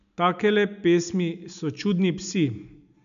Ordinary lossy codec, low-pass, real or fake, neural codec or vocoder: none; 7.2 kHz; real; none